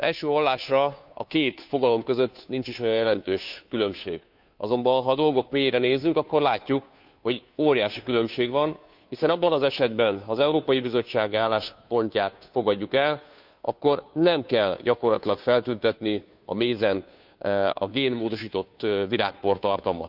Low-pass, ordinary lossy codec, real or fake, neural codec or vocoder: 5.4 kHz; none; fake; codec, 16 kHz, 2 kbps, FunCodec, trained on Chinese and English, 25 frames a second